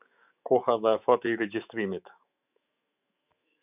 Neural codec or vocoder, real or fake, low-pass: autoencoder, 48 kHz, 128 numbers a frame, DAC-VAE, trained on Japanese speech; fake; 3.6 kHz